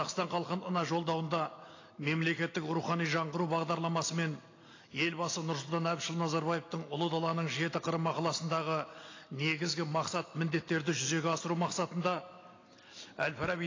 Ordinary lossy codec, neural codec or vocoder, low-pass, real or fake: AAC, 32 kbps; none; 7.2 kHz; real